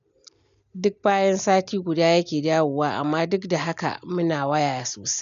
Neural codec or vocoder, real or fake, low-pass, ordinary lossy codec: none; real; 7.2 kHz; none